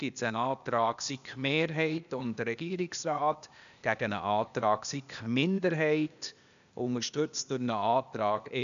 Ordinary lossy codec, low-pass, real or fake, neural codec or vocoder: none; 7.2 kHz; fake; codec, 16 kHz, 0.8 kbps, ZipCodec